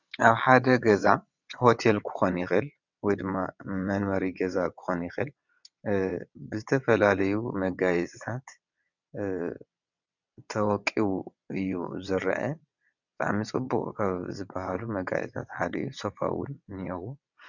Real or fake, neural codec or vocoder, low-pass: fake; vocoder, 22.05 kHz, 80 mel bands, WaveNeXt; 7.2 kHz